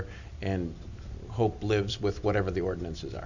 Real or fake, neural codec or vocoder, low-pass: real; none; 7.2 kHz